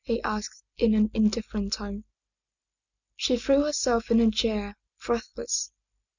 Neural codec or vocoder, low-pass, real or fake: none; 7.2 kHz; real